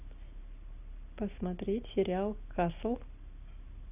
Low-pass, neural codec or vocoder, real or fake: 3.6 kHz; none; real